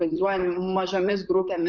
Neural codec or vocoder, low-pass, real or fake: vocoder, 44.1 kHz, 128 mel bands, Pupu-Vocoder; 7.2 kHz; fake